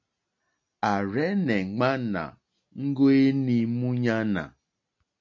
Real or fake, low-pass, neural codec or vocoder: real; 7.2 kHz; none